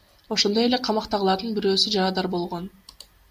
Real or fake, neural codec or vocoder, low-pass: real; none; 14.4 kHz